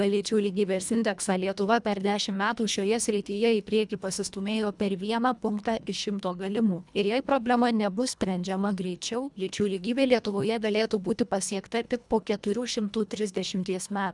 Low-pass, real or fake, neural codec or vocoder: 10.8 kHz; fake; codec, 24 kHz, 1.5 kbps, HILCodec